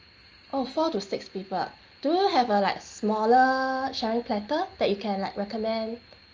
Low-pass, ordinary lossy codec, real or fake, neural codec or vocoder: 7.2 kHz; Opus, 24 kbps; real; none